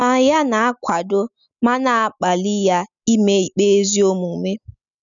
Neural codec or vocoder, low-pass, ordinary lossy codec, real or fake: none; 7.2 kHz; none; real